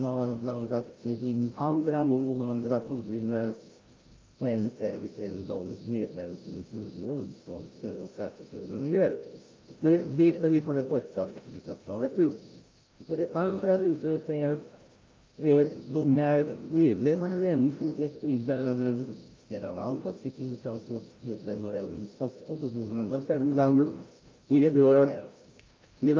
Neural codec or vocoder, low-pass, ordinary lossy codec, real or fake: codec, 16 kHz, 0.5 kbps, FreqCodec, larger model; 7.2 kHz; Opus, 32 kbps; fake